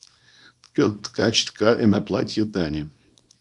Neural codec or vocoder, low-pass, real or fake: codec, 24 kHz, 0.9 kbps, WavTokenizer, small release; 10.8 kHz; fake